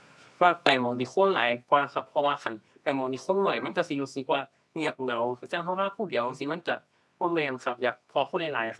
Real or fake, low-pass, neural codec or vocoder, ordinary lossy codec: fake; none; codec, 24 kHz, 0.9 kbps, WavTokenizer, medium music audio release; none